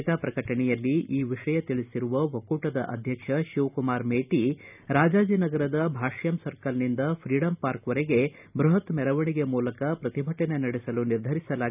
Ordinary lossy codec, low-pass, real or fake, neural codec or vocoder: none; 3.6 kHz; real; none